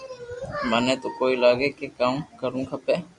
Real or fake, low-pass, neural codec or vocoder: real; 10.8 kHz; none